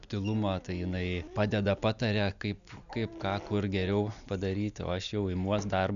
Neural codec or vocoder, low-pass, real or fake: none; 7.2 kHz; real